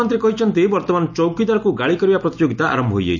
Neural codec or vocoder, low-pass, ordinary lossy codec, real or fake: none; 7.2 kHz; none; real